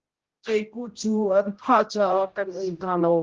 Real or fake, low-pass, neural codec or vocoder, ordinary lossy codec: fake; 7.2 kHz; codec, 16 kHz, 0.5 kbps, X-Codec, HuBERT features, trained on general audio; Opus, 16 kbps